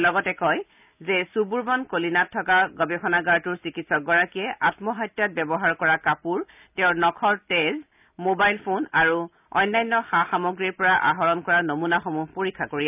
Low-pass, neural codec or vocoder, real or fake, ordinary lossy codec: 3.6 kHz; none; real; none